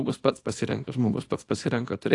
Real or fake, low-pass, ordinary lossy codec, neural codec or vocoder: fake; 10.8 kHz; AAC, 48 kbps; codec, 24 kHz, 0.9 kbps, WavTokenizer, small release